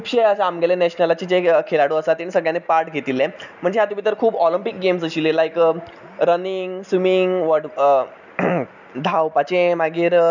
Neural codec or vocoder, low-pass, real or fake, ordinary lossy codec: none; 7.2 kHz; real; none